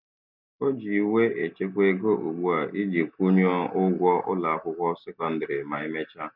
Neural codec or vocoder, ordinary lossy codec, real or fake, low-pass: none; none; real; 5.4 kHz